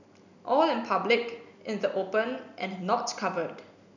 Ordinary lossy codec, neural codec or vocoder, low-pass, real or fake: none; none; 7.2 kHz; real